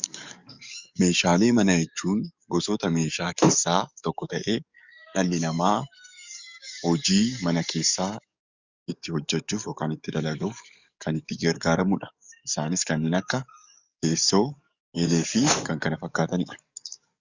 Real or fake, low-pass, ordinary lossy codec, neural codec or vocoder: fake; 7.2 kHz; Opus, 64 kbps; codec, 44.1 kHz, 7.8 kbps, DAC